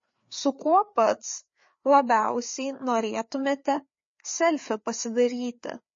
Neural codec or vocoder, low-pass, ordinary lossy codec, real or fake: codec, 16 kHz, 4 kbps, FreqCodec, larger model; 7.2 kHz; MP3, 32 kbps; fake